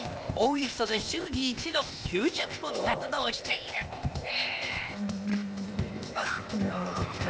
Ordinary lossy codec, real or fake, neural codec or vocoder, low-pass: none; fake; codec, 16 kHz, 0.8 kbps, ZipCodec; none